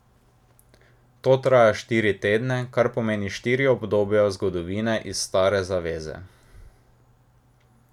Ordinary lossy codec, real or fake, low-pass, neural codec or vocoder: none; real; 19.8 kHz; none